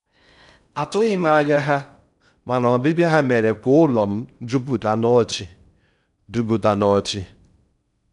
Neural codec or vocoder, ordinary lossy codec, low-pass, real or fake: codec, 16 kHz in and 24 kHz out, 0.6 kbps, FocalCodec, streaming, 4096 codes; none; 10.8 kHz; fake